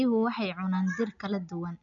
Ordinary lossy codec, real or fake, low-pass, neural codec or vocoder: none; real; 7.2 kHz; none